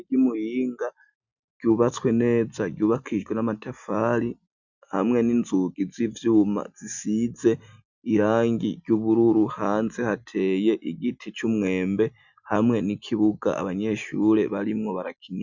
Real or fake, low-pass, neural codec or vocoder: real; 7.2 kHz; none